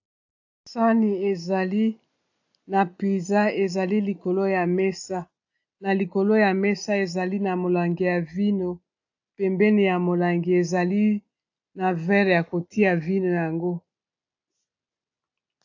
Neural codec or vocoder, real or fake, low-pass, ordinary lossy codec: none; real; 7.2 kHz; AAC, 48 kbps